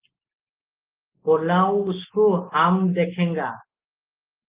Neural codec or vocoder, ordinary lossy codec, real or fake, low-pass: none; Opus, 32 kbps; real; 3.6 kHz